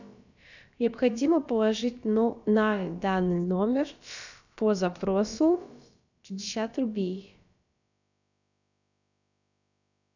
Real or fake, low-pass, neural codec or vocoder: fake; 7.2 kHz; codec, 16 kHz, about 1 kbps, DyCAST, with the encoder's durations